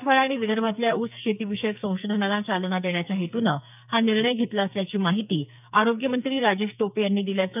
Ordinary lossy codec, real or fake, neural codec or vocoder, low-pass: none; fake; codec, 44.1 kHz, 2.6 kbps, SNAC; 3.6 kHz